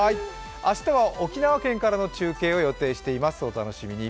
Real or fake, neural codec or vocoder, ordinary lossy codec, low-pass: real; none; none; none